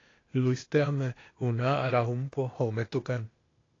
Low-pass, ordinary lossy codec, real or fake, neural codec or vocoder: 7.2 kHz; AAC, 32 kbps; fake; codec, 16 kHz, 0.8 kbps, ZipCodec